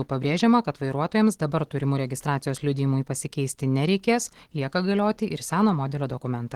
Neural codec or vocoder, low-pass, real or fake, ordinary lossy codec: none; 19.8 kHz; real; Opus, 16 kbps